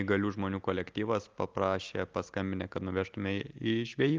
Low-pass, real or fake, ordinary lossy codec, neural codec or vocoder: 7.2 kHz; real; Opus, 32 kbps; none